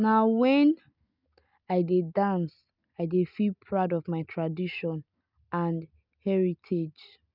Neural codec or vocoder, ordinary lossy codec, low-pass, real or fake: none; none; 5.4 kHz; real